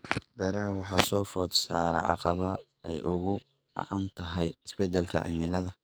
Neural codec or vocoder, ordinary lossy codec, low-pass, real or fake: codec, 44.1 kHz, 2.6 kbps, SNAC; none; none; fake